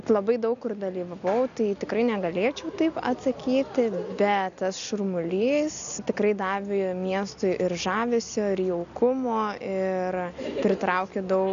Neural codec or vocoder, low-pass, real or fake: none; 7.2 kHz; real